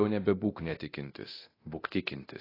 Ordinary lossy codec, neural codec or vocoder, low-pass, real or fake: AAC, 24 kbps; codec, 24 kHz, 0.9 kbps, DualCodec; 5.4 kHz; fake